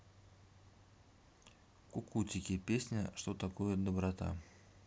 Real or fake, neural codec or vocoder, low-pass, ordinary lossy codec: real; none; none; none